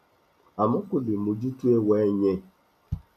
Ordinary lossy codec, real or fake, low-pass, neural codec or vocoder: none; real; 14.4 kHz; none